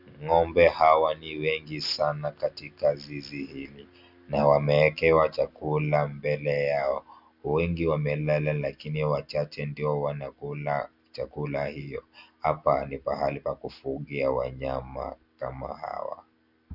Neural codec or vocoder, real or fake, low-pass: none; real; 5.4 kHz